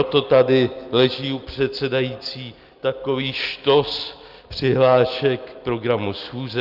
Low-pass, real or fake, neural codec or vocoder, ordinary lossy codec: 5.4 kHz; real; none; Opus, 32 kbps